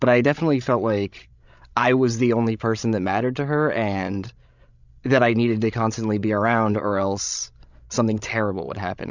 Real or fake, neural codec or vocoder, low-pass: fake; codec, 16 kHz, 16 kbps, FreqCodec, larger model; 7.2 kHz